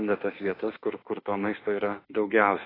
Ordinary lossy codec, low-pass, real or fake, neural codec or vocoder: AAC, 24 kbps; 5.4 kHz; fake; autoencoder, 48 kHz, 32 numbers a frame, DAC-VAE, trained on Japanese speech